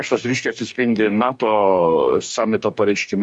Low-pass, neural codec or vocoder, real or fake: 10.8 kHz; codec, 44.1 kHz, 2.6 kbps, DAC; fake